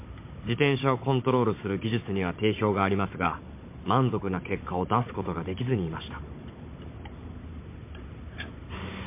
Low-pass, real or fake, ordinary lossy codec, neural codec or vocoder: 3.6 kHz; fake; MP3, 24 kbps; codec, 16 kHz, 16 kbps, FunCodec, trained on Chinese and English, 50 frames a second